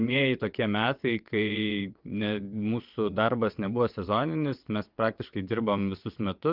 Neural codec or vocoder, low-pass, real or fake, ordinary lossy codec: vocoder, 22.05 kHz, 80 mel bands, WaveNeXt; 5.4 kHz; fake; Opus, 24 kbps